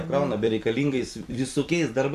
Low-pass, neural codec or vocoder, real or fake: 14.4 kHz; vocoder, 44.1 kHz, 128 mel bands every 256 samples, BigVGAN v2; fake